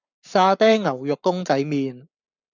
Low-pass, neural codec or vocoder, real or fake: 7.2 kHz; codec, 44.1 kHz, 7.8 kbps, Pupu-Codec; fake